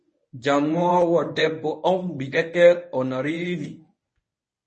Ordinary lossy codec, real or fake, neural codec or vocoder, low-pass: MP3, 32 kbps; fake; codec, 24 kHz, 0.9 kbps, WavTokenizer, medium speech release version 1; 10.8 kHz